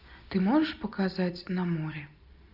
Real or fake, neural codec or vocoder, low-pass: real; none; 5.4 kHz